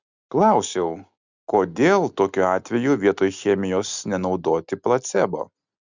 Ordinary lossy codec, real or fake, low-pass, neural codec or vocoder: Opus, 64 kbps; real; 7.2 kHz; none